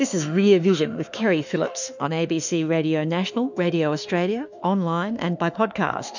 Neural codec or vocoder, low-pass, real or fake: autoencoder, 48 kHz, 32 numbers a frame, DAC-VAE, trained on Japanese speech; 7.2 kHz; fake